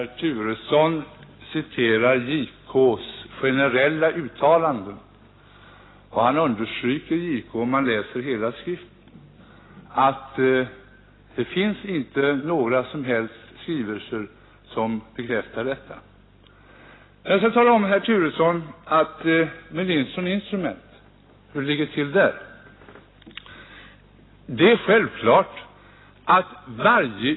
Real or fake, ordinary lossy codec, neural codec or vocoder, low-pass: real; AAC, 16 kbps; none; 7.2 kHz